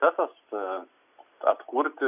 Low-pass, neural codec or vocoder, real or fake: 3.6 kHz; none; real